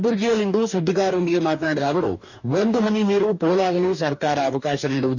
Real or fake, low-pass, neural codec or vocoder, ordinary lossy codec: fake; 7.2 kHz; codec, 44.1 kHz, 2.6 kbps, DAC; none